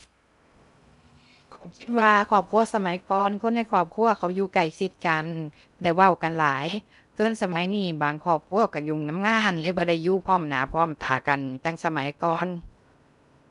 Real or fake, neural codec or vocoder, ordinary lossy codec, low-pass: fake; codec, 16 kHz in and 24 kHz out, 0.6 kbps, FocalCodec, streaming, 2048 codes; none; 10.8 kHz